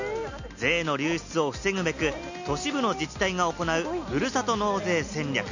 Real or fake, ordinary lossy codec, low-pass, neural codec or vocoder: real; none; 7.2 kHz; none